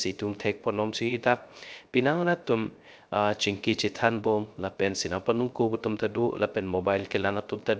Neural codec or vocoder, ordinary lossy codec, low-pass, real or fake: codec, 16 kHz, 0.3 kbps, FocalCodec; none; none; fake